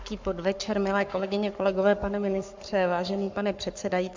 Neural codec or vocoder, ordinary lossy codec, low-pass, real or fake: codec, 44.1 kHz, 7.8 kbps, Pupu-Codec; MP3, 64 kbps; 7.2 kHz; fake